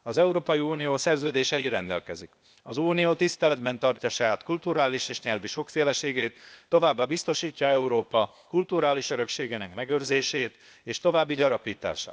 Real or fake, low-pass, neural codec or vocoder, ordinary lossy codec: fake; none; codec, 16 kHz, 0.8 kbps, ZipCodec; none